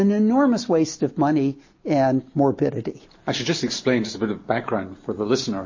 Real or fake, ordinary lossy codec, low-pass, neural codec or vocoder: real; MP3, 32 kbps; 7.2 kHz; none